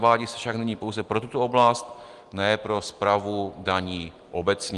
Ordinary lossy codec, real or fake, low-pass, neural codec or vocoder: Opus, 24 kbps; real; 10.8 kHz; none